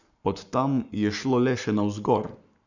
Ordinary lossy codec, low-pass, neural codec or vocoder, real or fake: none; 7.2 kHz; codec, 44.1 kHz, 7.8 kbps, Pupu-Codec; fake